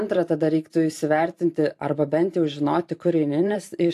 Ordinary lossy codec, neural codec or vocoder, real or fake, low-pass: AAC, 64 kbps; vocoder, 44.1 kHz, 128 mel bands every 512 samples, BigVGAN v2; fake; 14.4 kHz